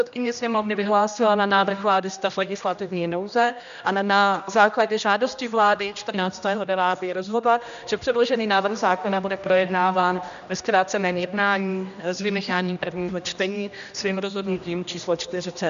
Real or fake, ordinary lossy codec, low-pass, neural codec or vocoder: fake; MP3, 96 kbps; 7.2 kHz; codec, 16 kHz, 1 kbps, X-Codec, HuBERT features, trained on general audio